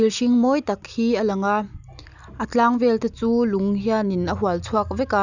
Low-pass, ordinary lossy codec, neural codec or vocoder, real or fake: 7.2 kHz; none; none; real